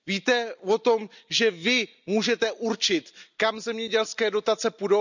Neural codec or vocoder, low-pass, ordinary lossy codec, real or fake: none; 7.2 kHz; none; real